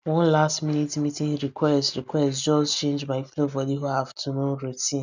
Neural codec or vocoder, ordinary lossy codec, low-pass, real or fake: none; none; 7.2 kHz; real